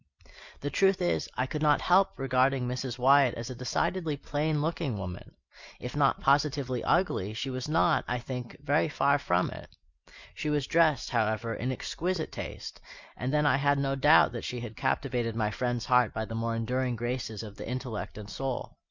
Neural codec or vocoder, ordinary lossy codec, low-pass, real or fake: none; Opus, 64 kbps; 7.2 kHz; real